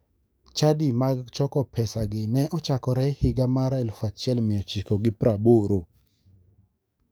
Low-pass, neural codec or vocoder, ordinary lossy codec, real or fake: none; codec, 44.1 kHz, 7.8 kbps, DAC; none; fake